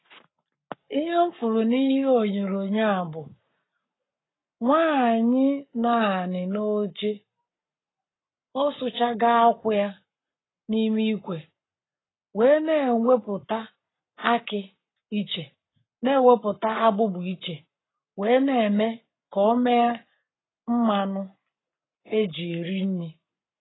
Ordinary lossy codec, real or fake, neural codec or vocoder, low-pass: AAC, 16 kbps; fake; codec, 44.1 kHz, 7.8 kbps, Pupu-Codec; 7.2 kHz